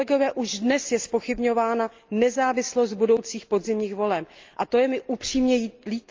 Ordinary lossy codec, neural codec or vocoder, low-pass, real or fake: Opus, 32 kbps; none; 7.2 kHz; real